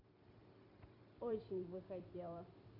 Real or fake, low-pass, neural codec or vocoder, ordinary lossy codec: fake; 5.4 kHz; vocoder, 44.1 kHz, 128 mel bands every 256 samples, BigVGAN v2; none